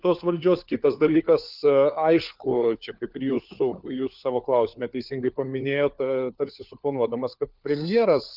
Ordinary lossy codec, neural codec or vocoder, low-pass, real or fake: Opus, 24 kbps; codec, 16 kHz, 4 kbps, FunCodec, trained on LibriTTS, 50 frames a second; 5.4 kHz; fake